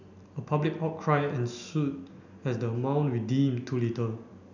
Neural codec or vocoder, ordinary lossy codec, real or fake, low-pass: none; none; real; 7.2 kHz